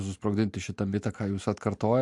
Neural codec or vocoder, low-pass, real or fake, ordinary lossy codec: none; 10.8 kHz; real; MP3, 64 kbps